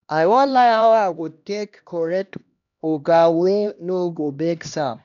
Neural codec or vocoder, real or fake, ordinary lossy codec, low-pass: codec, 16 kHz, 1 kbps, X-Codec, HuBERT features, trained on LibriSpeech; fake; none; 7.2 kHz